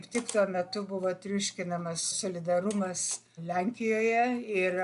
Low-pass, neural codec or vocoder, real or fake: 10.8 kHz; none; real